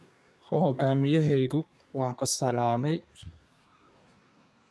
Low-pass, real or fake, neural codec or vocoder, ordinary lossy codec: none; fake; codec, 24 kHz, 1 kbps, SNAC; none